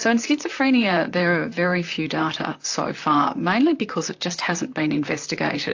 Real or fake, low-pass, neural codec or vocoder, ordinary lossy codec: fake; 7.2 kHz; vocoder, 44.1 kHz, 128 mel bands, Pupu-Vocoder; AAC, 48 kbps